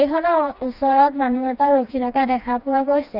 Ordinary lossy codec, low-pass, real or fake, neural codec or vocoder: none; 5.4 kHz; fake; codec, 16 kHz, 2 kbps, FreqCodec, smaller model